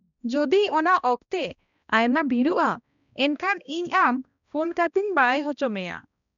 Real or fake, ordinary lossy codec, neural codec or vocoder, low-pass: fake; none; codec, 16 kHz, 1 kbps, X-Codec, HuBERT features, trained on balanced general audio; 7.2 kHz